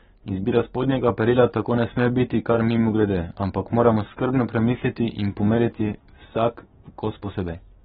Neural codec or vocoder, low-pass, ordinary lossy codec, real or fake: codec, 16 kHz, 6 kbps, DAC; 7.2 kHz; AAC, 16 kbps; fake